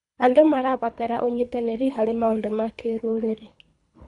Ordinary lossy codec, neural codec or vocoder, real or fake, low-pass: none; codec, 24 kHz, 3 kbps, HILCodec; fake; 10.8 kHz